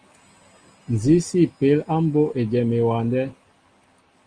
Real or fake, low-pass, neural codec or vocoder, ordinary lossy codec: real; 9.9 kHz; none; Opus, 24 kbps